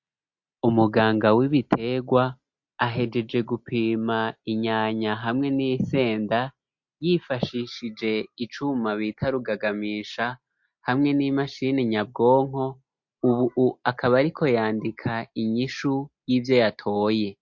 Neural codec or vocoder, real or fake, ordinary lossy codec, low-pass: none; real; MP3, 48 kbps; 7.2 kHz